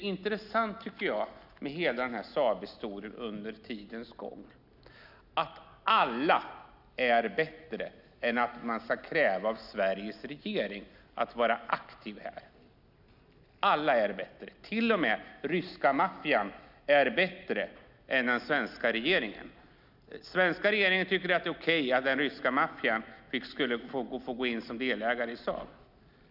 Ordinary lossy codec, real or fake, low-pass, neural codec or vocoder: none; real; 5.4 kHz; none